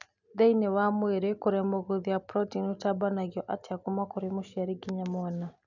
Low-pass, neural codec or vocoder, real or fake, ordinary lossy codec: 7.2 kHz; none; real; none